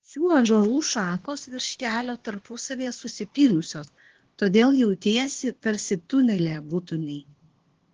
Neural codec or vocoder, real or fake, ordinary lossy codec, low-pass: codec, 16 kHz, 0.8 kbps, ZipCodec; fake; Opus, 16 kbps; 7.2 kHz